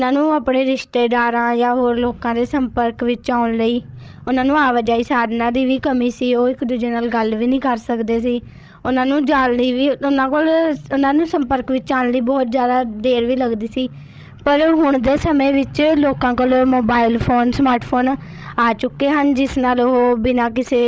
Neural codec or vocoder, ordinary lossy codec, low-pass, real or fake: codec, 16 kHz, 16 kbps, FreqCodec, larger model; none; none; fake